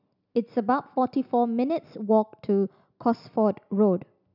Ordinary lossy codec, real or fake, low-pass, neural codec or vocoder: none; real; 5.4 kHz; none